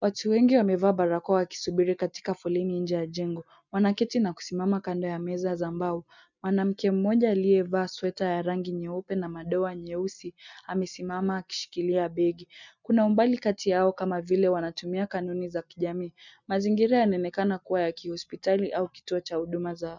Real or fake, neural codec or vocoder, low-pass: real; none; 7.2 kHz